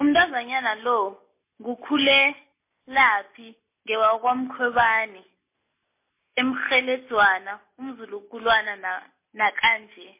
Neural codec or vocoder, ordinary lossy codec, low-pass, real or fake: none; MP3, 24 kbps; 3.6 kHz; real